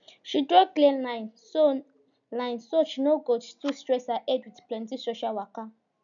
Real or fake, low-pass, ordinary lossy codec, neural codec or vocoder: real; 7.2 kHz; none; none